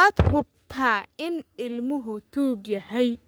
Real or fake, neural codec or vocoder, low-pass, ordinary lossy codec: fake; codec, 44.1 kHz, 3.4 kbps, Pupu-Codec; none; none